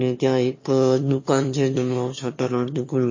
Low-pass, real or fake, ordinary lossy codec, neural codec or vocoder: 7.2 kHz; fake; MP3, 32 kbps; autoencoder, 22.05 kHz, a latent of 192 numbers a frame, VITS, trained on one speaker